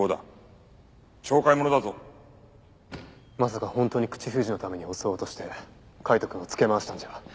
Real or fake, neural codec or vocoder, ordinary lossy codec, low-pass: real; none; none; none